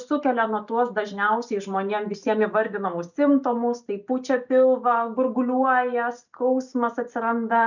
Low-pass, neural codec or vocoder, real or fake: 7.2 kHz; none; real